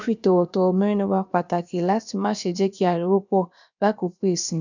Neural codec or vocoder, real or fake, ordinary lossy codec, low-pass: codec, 16 kHz, about 1 kbps, DyCAST, with the encoder's durations; fake; none; 7.2 kHz